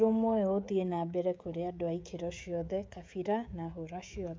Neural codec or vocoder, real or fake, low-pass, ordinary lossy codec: codec, 16 kHz, 16 kbps, FreqCodec, smaller model; fake; none; none